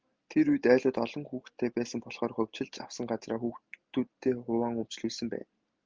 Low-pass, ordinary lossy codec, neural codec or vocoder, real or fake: 7.2 kHz; Opus, 32 kbps; none; real